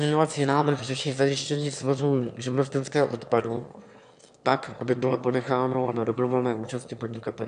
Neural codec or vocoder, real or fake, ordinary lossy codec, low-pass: autoencoder, 22.05 kHz, a latent of 192 numbers a frame, VITS, trained on one speaker; fake; AAC, 96 kbps; 9.9 kHz